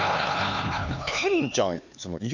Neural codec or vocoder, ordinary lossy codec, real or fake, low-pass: codec, 16 kHz, 4 kbps, X-Codec, HuBERT features, trained on LibriSpeech; none; fake; 7.2 kHz